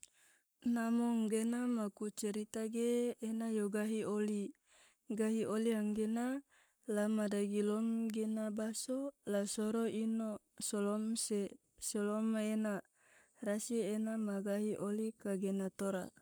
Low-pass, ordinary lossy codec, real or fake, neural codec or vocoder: none; none; fake; codec, 44.1 kHz, 7.8 kbps, Pupu-Codec